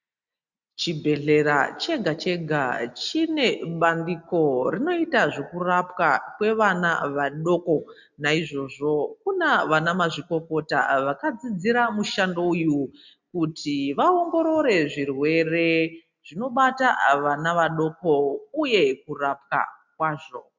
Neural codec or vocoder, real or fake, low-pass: none; real; 7.2 kHz